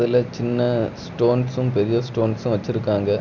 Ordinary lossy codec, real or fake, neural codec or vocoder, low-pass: none; real; none; 7.2 kHz